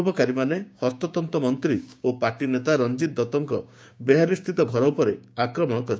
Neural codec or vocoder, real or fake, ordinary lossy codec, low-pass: codec, 16 kHz, 6 kbps, DAC; fake; none; none